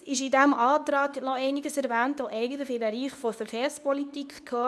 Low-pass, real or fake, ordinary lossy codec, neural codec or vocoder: none; fake; none; codec, 24 kHz, 0.9 kbps, WavTokenizer, medium speech release version 2